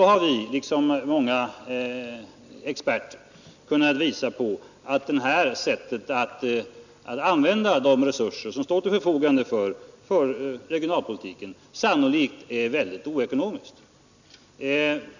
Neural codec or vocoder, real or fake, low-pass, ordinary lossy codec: none; real; 7.2 kHz; none